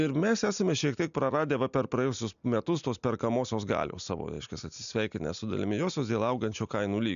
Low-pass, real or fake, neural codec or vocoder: 7.2 kHz; real; none